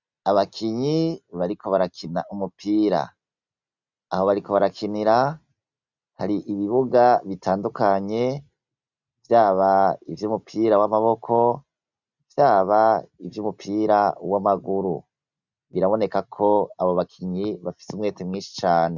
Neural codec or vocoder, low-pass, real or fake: none; 7.2 kHz; real